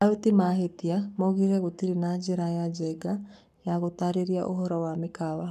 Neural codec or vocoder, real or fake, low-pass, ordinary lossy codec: codec, 44.1 kHz, 7.8 kbps, Pupu-Codec; fake; 14.4 kHz; none